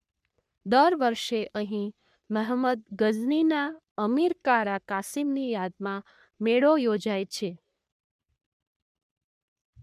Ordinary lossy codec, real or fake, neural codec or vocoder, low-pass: none; fake; codec, 44.1 kHz, 3.4 kbps, Pupu-Codec; 14.4 kHz